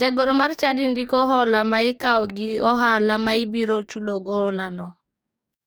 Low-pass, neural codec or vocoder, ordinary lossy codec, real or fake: none; codec, 44.1 kHz, 2.6 kbps, DAC; none; fake